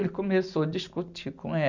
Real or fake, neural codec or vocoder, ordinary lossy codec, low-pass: real; none; Opus, 64 kbps; 7.2 kHz